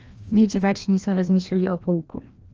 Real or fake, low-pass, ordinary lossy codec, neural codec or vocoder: fake; 7.2 kHz; Opus, 24 kbps; codec, 16 kHz, 1 kbps, FreqCodec, larger model